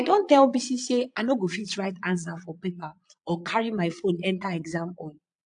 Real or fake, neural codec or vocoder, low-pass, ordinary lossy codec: fake; vocoder, 22.05 kHz, 80 mel bands, Vocos; 9.9 kHz; AAC, 64 kbps